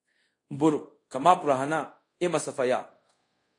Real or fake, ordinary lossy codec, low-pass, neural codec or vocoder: fake; AAC, 48 kbps; 10.8 kHz; codec, 24 kHz, 0.5 kbps, DualCodec